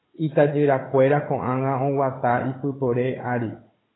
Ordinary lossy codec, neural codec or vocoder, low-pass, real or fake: AAC, 16 kbps; codec, 16 kHz, 16 kbps, FunCodec, trained on Chinese and English, 50 frames a second; 7.2 kHz; fake